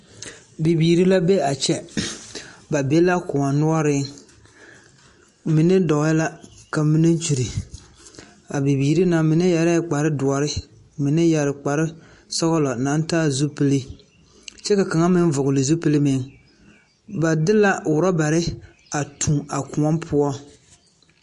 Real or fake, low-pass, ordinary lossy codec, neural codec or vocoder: real; 14.4 kHz; MP3, 48 kbps; none